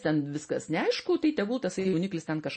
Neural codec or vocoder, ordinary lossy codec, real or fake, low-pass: none; MP3, 32 kbps; real; 10.8 kHz